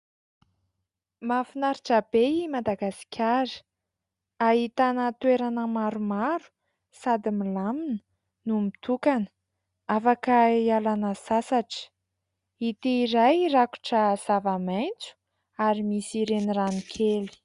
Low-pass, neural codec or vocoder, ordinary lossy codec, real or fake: 10.8 kHz; none; Opus, 64 kbps; real